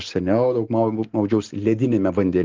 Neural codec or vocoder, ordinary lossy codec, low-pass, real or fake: none; Opus, 16 kbps; 7.2 kHz; real